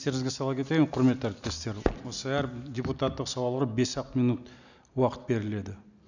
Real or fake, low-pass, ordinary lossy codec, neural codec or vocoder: real; 7.2 kHz; none; none